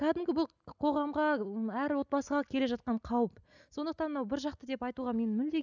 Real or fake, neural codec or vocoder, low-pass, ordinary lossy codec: real; none; 7.2 kHz; none